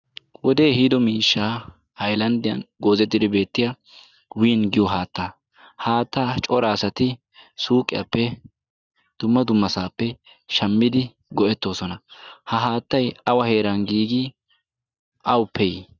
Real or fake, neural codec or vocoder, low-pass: real; none; 7.2 kHz